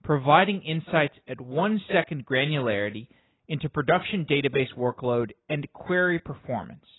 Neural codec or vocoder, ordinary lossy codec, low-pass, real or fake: none; AAC, 16 kbps; 7.2 kHz; real